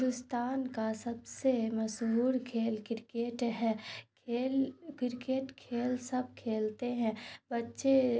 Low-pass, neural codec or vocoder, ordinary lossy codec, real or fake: none; none; none; real